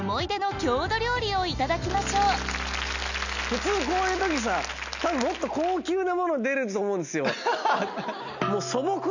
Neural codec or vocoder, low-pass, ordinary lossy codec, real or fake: none; 7.2 kHz; none; real